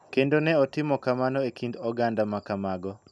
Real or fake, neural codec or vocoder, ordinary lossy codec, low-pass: real; none; none; none